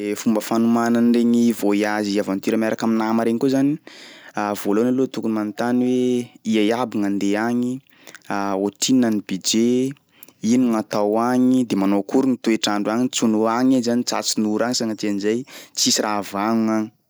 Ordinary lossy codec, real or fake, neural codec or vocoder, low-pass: none; real; none; none